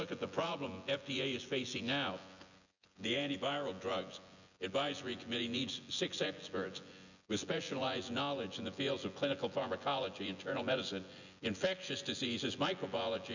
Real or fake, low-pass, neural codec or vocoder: fake; 7.2 kHz; vocoder, 24 kHz, 100 mel bands, Vocos